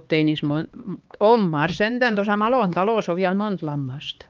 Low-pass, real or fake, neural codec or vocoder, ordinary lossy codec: 7.2 kHz; fake; codec, 16 kHz, 2 kbps, X-Codec, HuBERT features, trained on LibriSpeech; Opus, 24 kbps